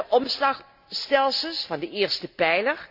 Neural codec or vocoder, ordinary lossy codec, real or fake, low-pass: none; none; real; 5.4 kHz